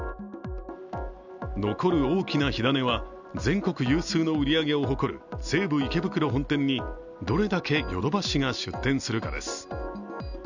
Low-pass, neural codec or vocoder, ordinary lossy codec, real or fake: 7.2 kHz; none; none; real